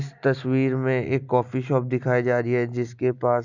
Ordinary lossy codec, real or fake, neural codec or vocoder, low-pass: none; real; none; 7.2 kHz